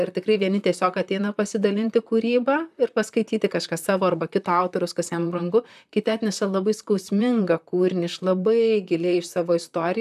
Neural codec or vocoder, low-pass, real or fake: vocoder, 44.1 kHz, 128 mel bands, Pupu-Vocoder; 14.4 kHz; fake